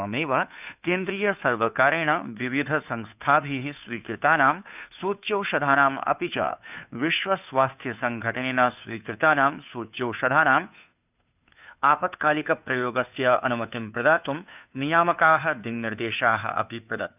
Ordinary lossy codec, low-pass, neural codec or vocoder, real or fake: none; 3.6 kHz; codec, 16 kHz, 2 kbps, FunCodec, trained on Chinese and English, 25 frames a second; fake